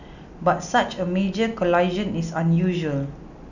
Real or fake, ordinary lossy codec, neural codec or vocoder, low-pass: real; none; none; 7.2 kHz